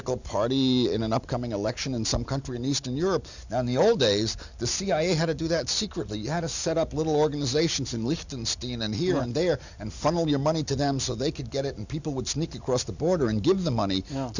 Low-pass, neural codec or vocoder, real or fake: 7.2 kHz; none; real